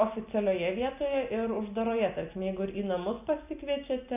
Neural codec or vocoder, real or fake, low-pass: none; real; 3.6 kHz